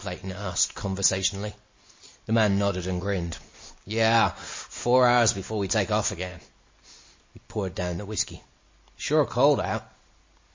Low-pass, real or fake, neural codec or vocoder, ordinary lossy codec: 7.2 kHz; real; none; MP3, 32 kbps